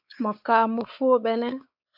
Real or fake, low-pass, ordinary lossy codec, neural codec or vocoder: fake; 5.4 kHz; AAC, 48 kbps; codec, 16 kHz, 4 kbps, X-Codec, WavLM features, trained on Multilingual LibriSpeech